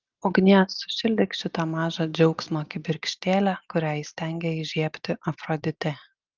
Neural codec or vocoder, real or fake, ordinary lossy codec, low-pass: none; real; Opus, 24 kbps; 7.2 kHz